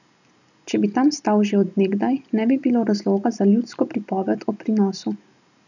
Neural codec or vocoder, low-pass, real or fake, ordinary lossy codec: none; 7.2 kHz; real; none